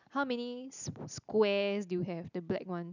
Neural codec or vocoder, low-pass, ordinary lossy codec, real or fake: none; 7.2 kHz; none; real